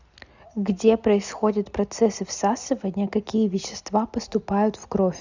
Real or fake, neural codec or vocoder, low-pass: real; none; 7.2 kHz